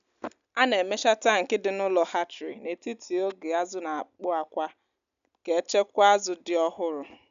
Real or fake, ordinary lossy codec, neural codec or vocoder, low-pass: real; none; none; 7.2 kHz